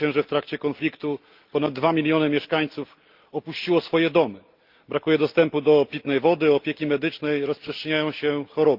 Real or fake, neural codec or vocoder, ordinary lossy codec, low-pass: real; none; Opus, 32 kbps; 5.4 kHz